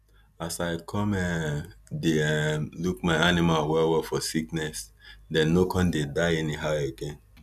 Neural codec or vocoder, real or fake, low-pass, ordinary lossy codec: none; real; 14.4 kHz; none